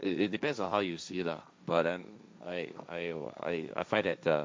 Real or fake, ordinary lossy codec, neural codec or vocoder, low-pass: fake; none; codec, 16 kHz, 1.1 kbps, Voila-Tokenizer; none